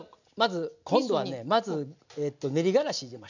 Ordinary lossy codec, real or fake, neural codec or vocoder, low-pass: none; real; none; 7.2 kHz